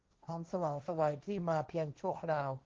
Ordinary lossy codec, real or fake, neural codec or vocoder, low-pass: Opus, 24 kbps; fake; codec, 16 kHz, 1.1 kbps, Voila-Tokenizer; 7.2 kHz